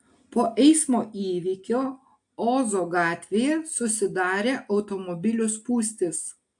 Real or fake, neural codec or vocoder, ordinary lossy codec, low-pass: real; none; AAC, 64 kbps; 10.8 kHz